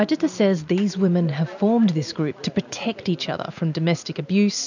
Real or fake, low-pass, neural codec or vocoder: real; 7.2 kHz; none